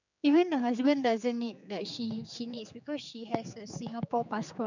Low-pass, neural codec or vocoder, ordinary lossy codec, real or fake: 7.2 kHz; codec, 16 kHz, 4 kbps, X-Codec, HuBERT features, trained on general audio; none; fake